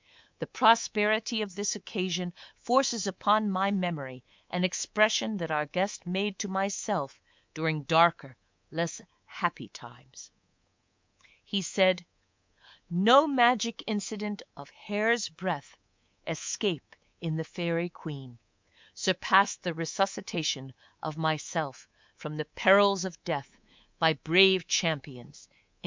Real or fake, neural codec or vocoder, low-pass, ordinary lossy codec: fake; codec, 24 kHz, 3.1 kbps, DualCodec; 7.2 kHz; MP3, 64 kbps